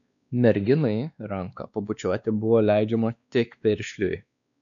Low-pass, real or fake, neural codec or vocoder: 7.2 kHz; fake; codec, 16 kHz, 2 kbps, X-Codec, WavLM features, trained on Multilingual LibriSpeech